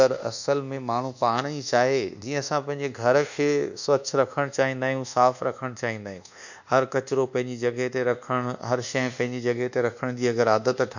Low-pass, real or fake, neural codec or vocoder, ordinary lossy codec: 7.2 kHz; fake; codec, 24 kHz, 1.2 kbps, DualCodec; none